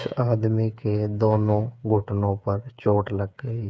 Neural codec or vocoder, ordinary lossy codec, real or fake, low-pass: codec, 16 kHz, 8 kbps, FreqCodec, smaller model; none; fake; none